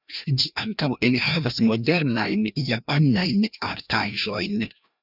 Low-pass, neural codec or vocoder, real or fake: 5.4 kHz; codec, 16 kHz, 1 kbps, FreqCodec, larger model; fake